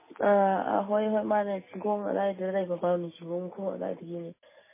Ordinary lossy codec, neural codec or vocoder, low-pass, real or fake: MP3, 16 kbps; none; 3.6 kHz; real